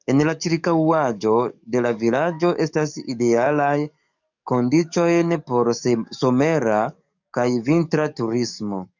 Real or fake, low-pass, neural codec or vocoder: fake; 7.2 kHz; codec, 44.1 kHz, 7.8 kbps, DAC